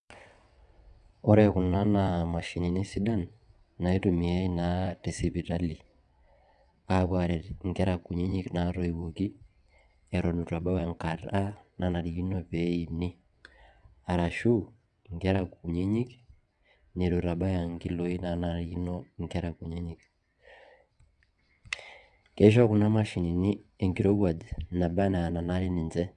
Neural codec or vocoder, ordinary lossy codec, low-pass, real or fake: vocoder, 22.05 kHz, 80 mel bands, WaveNeXt; none; 9.9 kHz; fake